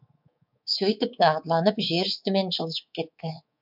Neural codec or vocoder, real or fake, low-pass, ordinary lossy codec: codec, 24 kHz, 3.1 kbps, DualCodec; fake; 5.4 kHz; MP3, 48 kbps